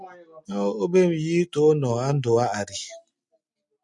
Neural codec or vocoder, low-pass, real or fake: none; 10.8 kHz; real